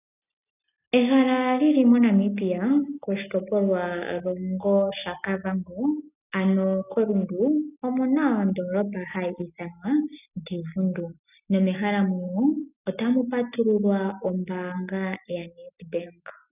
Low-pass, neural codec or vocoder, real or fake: 3.6 kHz; none; real